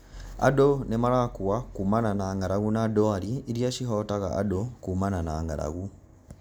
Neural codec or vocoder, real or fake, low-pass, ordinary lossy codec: none; real; none; none